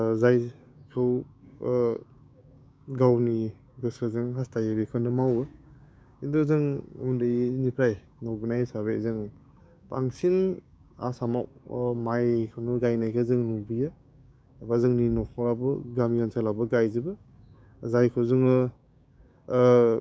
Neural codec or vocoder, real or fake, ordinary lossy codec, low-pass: codec, 16 kHz, 6 kbps, DAC; fake; none; none